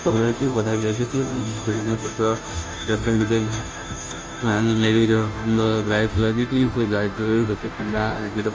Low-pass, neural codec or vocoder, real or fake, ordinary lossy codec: none; codec, 16 kHz, 0.5 kbps, FunCodec, trained on Chinese and English, 25 frames a second; fake; none